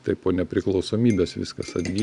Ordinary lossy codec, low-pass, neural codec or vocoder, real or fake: Opus, 64 kbps; 10.8 kHz; none; real